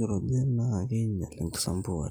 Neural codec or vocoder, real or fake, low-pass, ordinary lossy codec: none; real; none; none